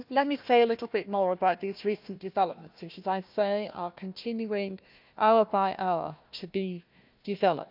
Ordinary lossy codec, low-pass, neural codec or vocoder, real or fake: none; 5.4 kHz; codec, 16 kHz, 1 kbps, FunCodec, trained on Chinese and English, 50 frames a second; fake